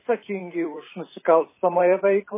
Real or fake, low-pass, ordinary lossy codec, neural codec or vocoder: fake; 3.6 kHz; MP3, 16 kbps; vocoder, 44.1 kHz, 128 mel bands every 512 samples, BigVGAN v2